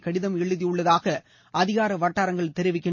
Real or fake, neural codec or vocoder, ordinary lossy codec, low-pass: real; none; MP3, 32 kbps; 7.2 kHz